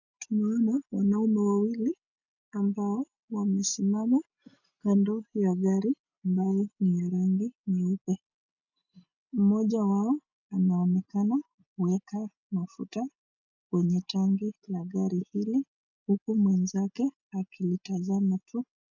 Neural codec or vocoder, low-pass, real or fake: none; 7.2 kHz; real